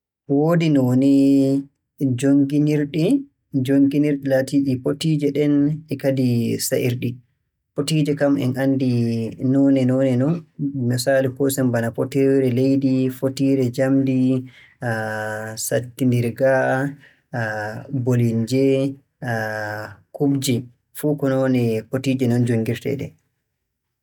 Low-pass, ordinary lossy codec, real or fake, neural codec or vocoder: 19.8 kHz; none; real; none